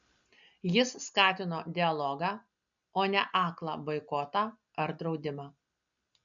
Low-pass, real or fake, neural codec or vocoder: 7.2 kHz; real; none